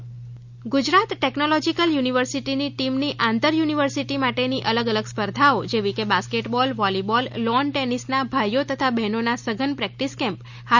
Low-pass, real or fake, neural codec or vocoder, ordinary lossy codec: 7.2 kHz; real; none; none